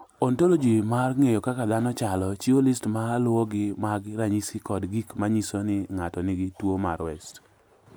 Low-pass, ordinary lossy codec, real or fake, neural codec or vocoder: none; none; real; none